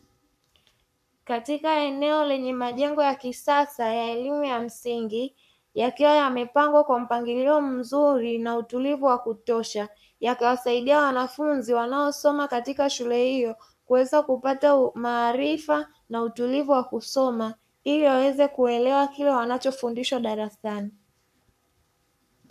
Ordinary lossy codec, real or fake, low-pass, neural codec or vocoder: MP3, 96 kbps; fake; 14.4 kHz; codec, 44.1 kHz, 7.8 kbps, Pupu-Codec